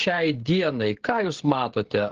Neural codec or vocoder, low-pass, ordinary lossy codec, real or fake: codec, 16 kHz, 16 kbps, FreqCodec, smaller model; 7.2 kHz; Opus, 16 kbps; fake